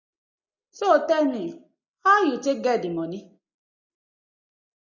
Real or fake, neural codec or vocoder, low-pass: real; none; 7.2 kHz